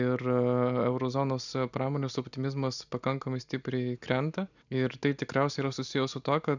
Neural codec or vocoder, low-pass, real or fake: none; 7.2 kHz; real